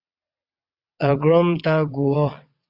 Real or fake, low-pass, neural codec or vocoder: fake; 5.4 kHz; vocoder, 22.05 kHz, 80 mel bands, WaveNeXt